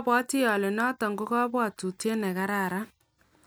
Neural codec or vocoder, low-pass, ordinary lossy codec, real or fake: none; none; none; real